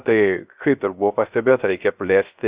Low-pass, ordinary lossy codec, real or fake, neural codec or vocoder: 3.6 kHz; Opus, 64 kbps; fake; codec, 16 kHz, 0.3 kbps, FocalCodec